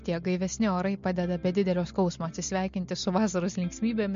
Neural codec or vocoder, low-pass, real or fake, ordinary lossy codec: none; 7.2 kHz; real; MP3, 48 kbps